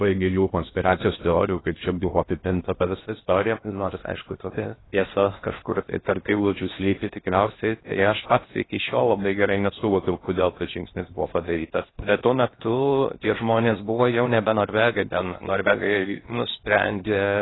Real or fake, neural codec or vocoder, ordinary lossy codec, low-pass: fake; codec, 16 kHz in and 24 kHz out, 0.6 kbps, FocalCodec, streaming, 2048 codes; AAC, 16 kbps; 7.2 kHz